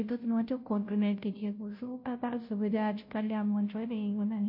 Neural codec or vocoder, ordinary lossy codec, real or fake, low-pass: codec, 16 kHz, 0.5 kbps, FunCodec, trained on Chinese and English, 25 frames a second; MP3, 32 kbps; fake; 5.4 kHz